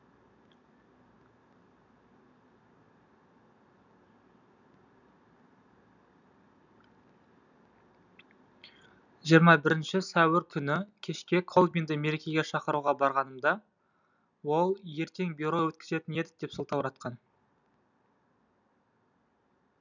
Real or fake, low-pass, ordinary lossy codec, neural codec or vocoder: real; 7.2 kHz; none; none